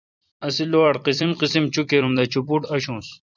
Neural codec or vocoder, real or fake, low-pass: vocoder, 44.1 kHz, 128 mel bands every 256 samples, BigVGAN v2; fake; 7.2 kHz